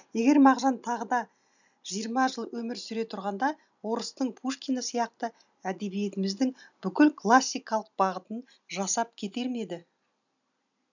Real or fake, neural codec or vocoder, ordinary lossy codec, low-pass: real; none; none; 7.2 kHz